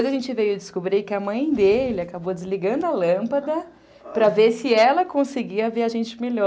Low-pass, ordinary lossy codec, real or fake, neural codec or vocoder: none; none; real; none